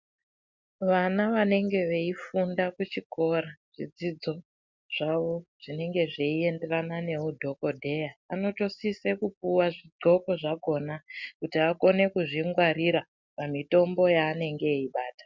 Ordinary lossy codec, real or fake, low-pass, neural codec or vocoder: MP3, 64 kbps; real; 7.2 kHz; none